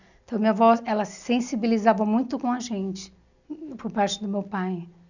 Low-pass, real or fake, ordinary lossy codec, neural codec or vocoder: 7.2 kHz; real; none; none